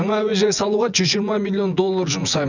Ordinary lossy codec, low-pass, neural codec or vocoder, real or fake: none; 7.2 kHz; vocoder, 24 kHz, 100 mel bands, Vocos; fake